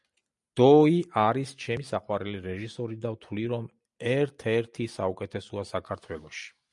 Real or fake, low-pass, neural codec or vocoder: real; 10.8 kHz; none